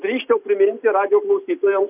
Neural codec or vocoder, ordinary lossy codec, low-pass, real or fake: none; AAC, 32 kbps; 3.6 kHz; real